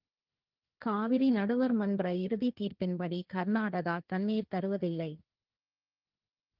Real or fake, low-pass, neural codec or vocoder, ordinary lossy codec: fake; 5.4 kHz; codec, 16 kHz, 1.1 kbps, Voila-Tokenizer; Opus, 32 kbps